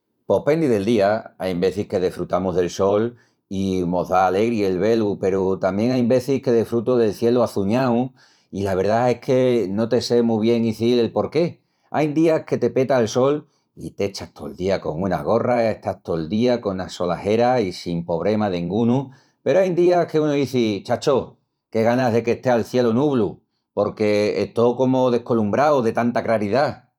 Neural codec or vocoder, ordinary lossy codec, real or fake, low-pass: vocoder, 44.1 kHz, 128 mel bands every 512 samples, BigVGAN v2; none; fake; 19.8 kHz